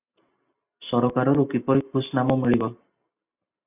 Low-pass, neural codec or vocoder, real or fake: 3.6 kHz; none; real